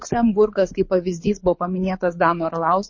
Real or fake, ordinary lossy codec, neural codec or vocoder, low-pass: fake; MP3, 32 kbps; codec, 24 kHz, 6 kbps, HILCodec; 7.2 kHz